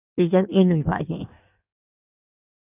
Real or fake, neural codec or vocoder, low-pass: fake; codec, 16 kHz in and 24 kHz out, 1.1 kbps, FireRedTTS-2 codec; 3.6 kHz